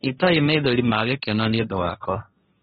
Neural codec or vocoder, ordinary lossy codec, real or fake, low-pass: codec, 16 kHz, 1.1 kbps, Voila-Tokenizer; AAC, 16 kbps; fake; 7.2 kHz